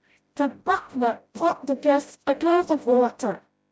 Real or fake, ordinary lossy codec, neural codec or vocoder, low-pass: fake; none; codec, 16 kHz, 0.5 kbps, FreqCodec, smaller model; none